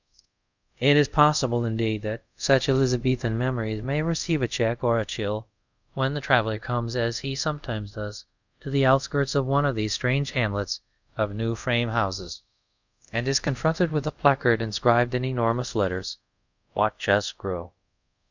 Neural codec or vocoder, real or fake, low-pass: codec, 24 kHz, 0.5 kbps, DualCodec; fake; 7.2 kHz